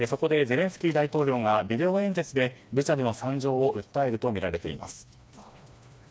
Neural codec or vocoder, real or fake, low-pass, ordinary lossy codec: codec, 16 kHz, 2 kbps, FreqCodec, smaller model; fake; none; none